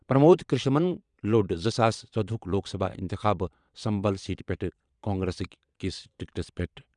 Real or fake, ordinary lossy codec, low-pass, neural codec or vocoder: fake; none; 9.9 kHz; vocoder, 22.05 kHz, 80 mel bands, Vocos